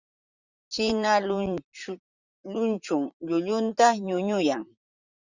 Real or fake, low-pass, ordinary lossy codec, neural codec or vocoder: fake; 7.2 kHz; Opus, 64 kbps; vocoder, 44.1 kHz, 128 mel bands, Pupu-Vocoder